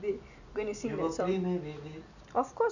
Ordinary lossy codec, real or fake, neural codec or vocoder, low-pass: none; real; none; 7.2 kHz